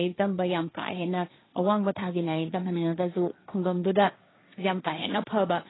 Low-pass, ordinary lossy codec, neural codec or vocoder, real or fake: 7.2 kHz; AAC, 16 kbps; codec, 16 kHz, 1.1 kbps, Voila-Tokenizer; fake